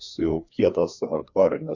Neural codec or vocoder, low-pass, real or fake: codec, 16 kHz, 2 kbps, FreqCodec, larger model; 7.2 kHz; fake